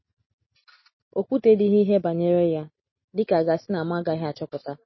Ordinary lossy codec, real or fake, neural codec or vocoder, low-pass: MP3, 24 kbps; real; none; 7.2 kHz